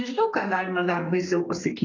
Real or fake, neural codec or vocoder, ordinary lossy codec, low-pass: fake; codec, 32 kHz, 1.9 kbps, SNAC; AAC, 32 kbps; 7.2 kHz